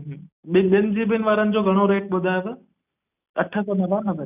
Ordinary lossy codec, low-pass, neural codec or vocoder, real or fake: none; 3.6 kHz; none; real